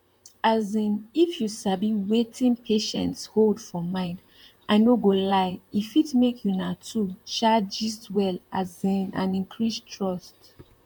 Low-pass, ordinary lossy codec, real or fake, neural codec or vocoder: 19.8 kHz; MP3, 96 kbps; fake; vocoder, 44.1 kHz, 128 mel bands, Pupu-Vocoder